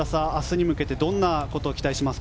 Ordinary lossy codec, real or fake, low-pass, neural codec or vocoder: none; real; none; none